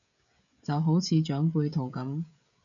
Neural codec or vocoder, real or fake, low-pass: codec, 16 kHz, 16 kbps, FreqCodec, smaller model; fake; 7.2 kHz